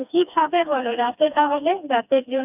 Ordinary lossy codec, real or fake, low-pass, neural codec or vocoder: none; fake; 3.6 kHz; codec, 16 kHz, 2 kbps, FreqCodec, smaller model